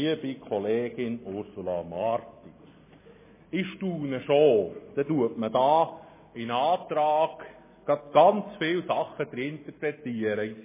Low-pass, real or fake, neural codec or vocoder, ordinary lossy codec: 3.6 kHz; real; none; MP3, 16 kbps